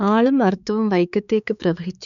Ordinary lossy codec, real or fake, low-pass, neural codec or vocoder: none; fake; 7.2 kHz; codec, 16 kHz, 4 kbps, X-Codec, HuBERT features, trained on balanced general audio